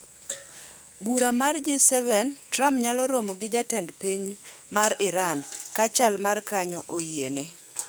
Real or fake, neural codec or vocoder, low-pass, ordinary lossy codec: fake; codec, 44.1 kHz, 2.6 kbps, SNAC; none; none